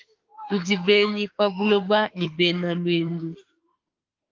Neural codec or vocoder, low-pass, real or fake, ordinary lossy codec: autoencoder, 48 kHz, 32 numbers a frame, DAC-VAE, trained on Japanese speech; 7.2 kHz; fake; Opus, 32 kbps